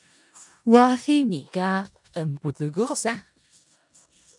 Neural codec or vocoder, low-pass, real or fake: codec, 16 kHz in and 24 kHz out, 0.4 kbps, LongCat-Audio-Codec, four codebook decoder; 10.8 kHz; fake